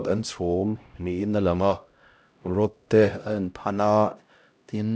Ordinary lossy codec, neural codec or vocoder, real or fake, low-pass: none; codec, 16 kHz, 0.5 kbps, X-Codec, HuBERT features, trained on LibriSpeech; fake; none